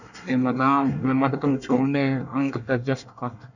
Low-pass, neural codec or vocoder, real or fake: 7.2 kHz; codec, 24 kHz, 1 kbps, SNAC; fake